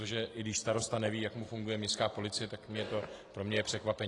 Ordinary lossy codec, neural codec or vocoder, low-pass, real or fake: AAC, 32 kbps; none; 10.8 kHz; real